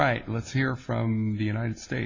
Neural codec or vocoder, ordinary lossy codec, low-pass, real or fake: none; Opus, 64 kbps; 7.2 kHz; real